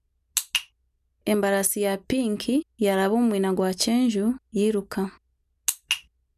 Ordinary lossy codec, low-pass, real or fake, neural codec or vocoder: none; 14.4 kHz; real; none